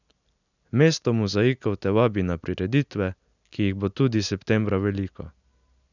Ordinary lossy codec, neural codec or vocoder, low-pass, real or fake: none; none; 7.2 kHz; real